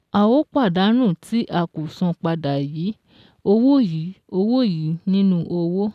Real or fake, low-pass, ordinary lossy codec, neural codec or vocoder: real; 14.4 kHz; none; none